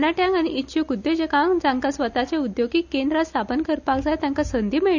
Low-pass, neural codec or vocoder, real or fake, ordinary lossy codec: 7.2 kHz; none; real; none